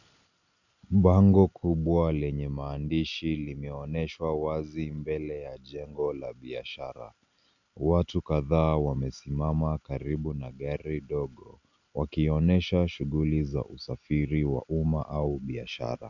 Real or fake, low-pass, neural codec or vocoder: real; 7.2 kHz; none